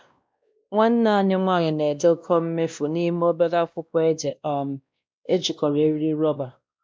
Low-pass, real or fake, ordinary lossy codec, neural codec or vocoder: none; fake; none; codec, 16 kHz, 1 kbps, X-Codec, WavLM features, trained on Multilingual LibriSpeech